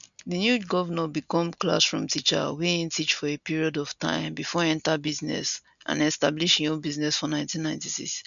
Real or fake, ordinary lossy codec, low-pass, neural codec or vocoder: real; none; 7.2 kHz; none